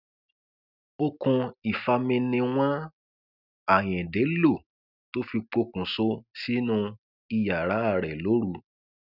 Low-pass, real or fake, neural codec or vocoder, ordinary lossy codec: 5.4 kHz; real; none; none